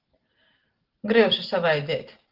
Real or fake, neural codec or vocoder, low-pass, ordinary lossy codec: real; none; 5.4 kHz; Opus, 16 kbps